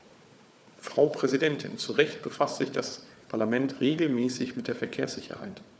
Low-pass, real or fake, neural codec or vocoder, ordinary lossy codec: none; fake; codec, 16 kHz, 4 kbps, FunCodec, trained on Chinese and English, 50 frames a second; none